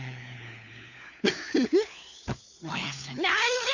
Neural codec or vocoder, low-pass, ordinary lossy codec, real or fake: codec, 16 kHz, 4 kbps, FunCodec, trained on LibriTTS, 50 frames a second; 7.2 kHz; none; fake